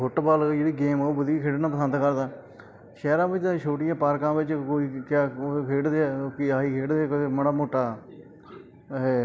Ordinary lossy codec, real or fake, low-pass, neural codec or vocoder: none; real; none; none